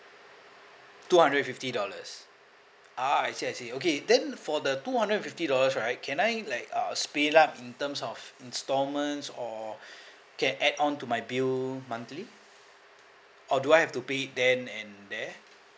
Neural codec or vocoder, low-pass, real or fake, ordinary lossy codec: none; none; real; none